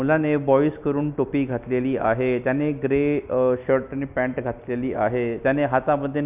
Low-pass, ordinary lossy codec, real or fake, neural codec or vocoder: 3.6 kHz; none; real; none